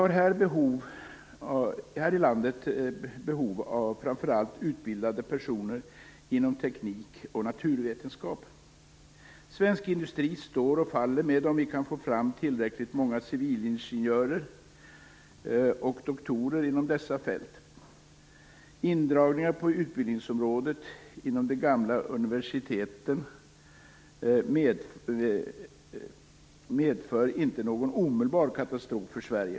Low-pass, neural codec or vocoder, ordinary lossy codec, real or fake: none; none; none; real